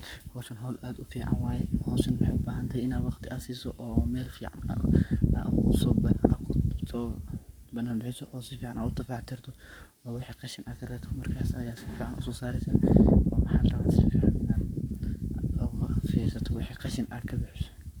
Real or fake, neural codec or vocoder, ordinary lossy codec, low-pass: fake; codec, 44.1 kHz, 7.8 kbps, Pupu-Codec; none; none